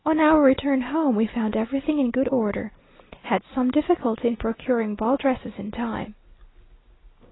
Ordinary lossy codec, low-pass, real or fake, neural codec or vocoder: AAC, 16 kbps; 7.2 kHz; real; none